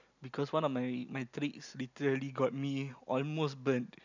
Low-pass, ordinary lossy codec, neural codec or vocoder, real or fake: 7.2 kHz; none; none; real